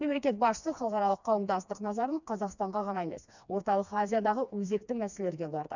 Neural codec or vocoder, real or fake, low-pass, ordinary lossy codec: codec, 16 kHz, 2 kbps, FreqCodec, smaller model; fake; 7.2 kHz; none